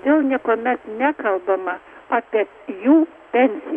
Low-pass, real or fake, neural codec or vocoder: 10.8 kHz; real; none